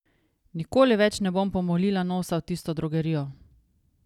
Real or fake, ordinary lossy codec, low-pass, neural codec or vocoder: real; none; 19.8 kHz; none